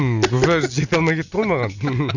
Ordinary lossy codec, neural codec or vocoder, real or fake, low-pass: none; none; real; 7.2 kHz